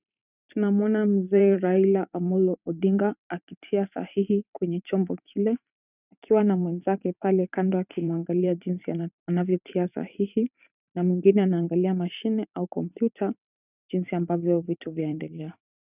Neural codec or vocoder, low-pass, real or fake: vocoder, 44.1 kHz, 128 mel bands every 512 samples, BigVGAN v2; 3.6 kHz; fake